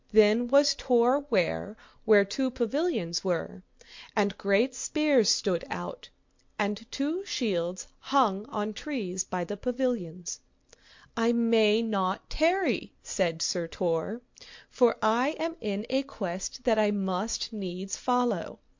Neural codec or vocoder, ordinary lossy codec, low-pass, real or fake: none; MP3, 48 kbps; 7.2 kHz; real